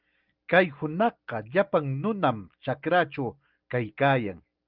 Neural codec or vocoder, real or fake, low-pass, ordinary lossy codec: none; real; 5.4 kHz; Opus, 32 kbps